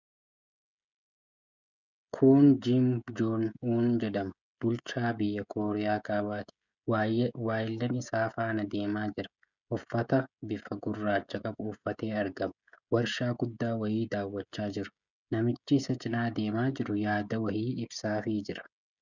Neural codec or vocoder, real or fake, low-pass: codec, 16 kHz, 16 kbps, FreqCodec, smaller model; fake; 7.2 kHz